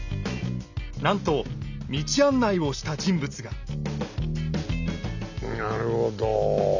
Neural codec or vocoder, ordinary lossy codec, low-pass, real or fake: none; none; 7.2 kHz; real